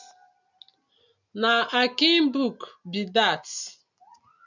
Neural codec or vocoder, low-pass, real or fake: none; 7.2 kHz; real